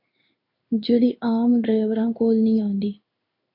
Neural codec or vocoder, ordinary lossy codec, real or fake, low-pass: codec, 16 kHz in and 24 kHz out, 1 kbps, XY-Tokenizer; MP3, 48 kbps; fake; 5.4 kHz